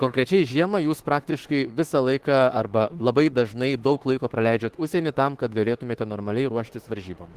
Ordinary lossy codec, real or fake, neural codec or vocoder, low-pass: Opus, 16 kbps; fake; autoencoder, 48 kHz, 32 numbers a frame, DAC-VAE, trained on Japanese speech; 14.4 kHz